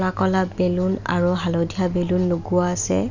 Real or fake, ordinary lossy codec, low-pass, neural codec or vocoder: real; none; 7.2 kHz; none